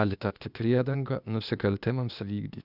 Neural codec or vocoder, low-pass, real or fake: codec, 16 kHz, 0.8 kbps, ZipCodec; 5.4 kHz; fake